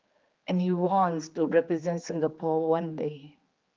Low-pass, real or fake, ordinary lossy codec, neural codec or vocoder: 7.2 kHz; fake; Opus, 32 kbps; codec, 16 kHz, 4 kbps, X-Codec, HuBERT features, trained on general audio